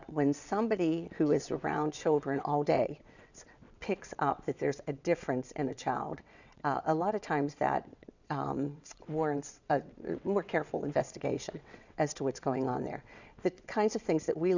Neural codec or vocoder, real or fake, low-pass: vocoder, 22.05 kHz, 80 mel bands, WaveNeXt; fake; 7.2 kHz